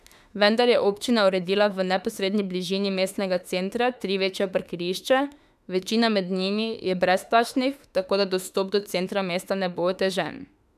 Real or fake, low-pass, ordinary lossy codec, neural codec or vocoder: fake; 14.4 kHz; none; autoencoder, 48 kHz, 32 numbers a frame, DAC-VAE, trained on Japanese speech